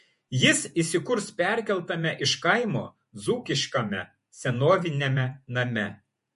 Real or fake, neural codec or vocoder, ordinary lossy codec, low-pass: fake; vocoder, 48 kHz, 128 mel bands, Vocos; MP3, 48 kbps; 14.4 kHz